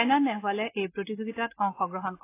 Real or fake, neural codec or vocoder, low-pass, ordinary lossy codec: real; none; 3.6 kHz; AAC, 24 kbps